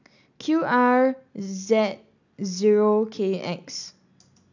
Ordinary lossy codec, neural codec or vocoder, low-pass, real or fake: none; none; 7.2 kHz; real